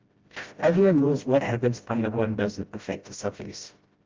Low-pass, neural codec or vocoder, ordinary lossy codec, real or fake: 7.2 kHz; codec, 16 kHz, 0.5 kbps, FreqCodec, smaller model; Opus, 32 kbps; fake